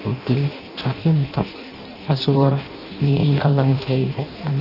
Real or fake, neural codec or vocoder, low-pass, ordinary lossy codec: fake; codec, 16 kHz in and 24 kHz out, 0.6 kbps, FireRedTTS-2 codec; 5.4 kHz; none